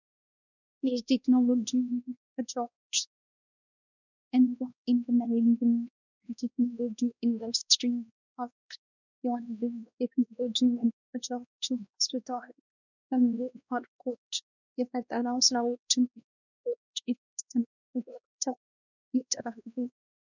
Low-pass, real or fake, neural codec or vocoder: 7.2 kHz; fake; codec, 16 kHz, 1 kbps, X-Codec, WavLM features, trained on Multilingual LibriSpeech